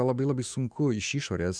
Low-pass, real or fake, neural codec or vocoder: 9.9 kHz; fake; autoencoder, 48 kHz, 128 numbers a frame, DAC-VAE, trained on Japanese speech